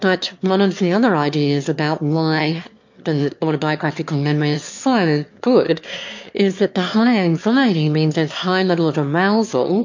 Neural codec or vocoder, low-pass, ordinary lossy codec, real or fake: autoencoder, 22.05 kHz, a latent of 192 numbers a frame, VITS, trained on one speaker; 7.2 kHz; MP3, 48 kbps; fake